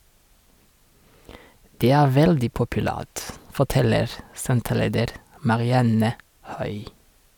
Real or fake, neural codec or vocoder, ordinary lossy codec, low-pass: real; none; none; 19.8 kHz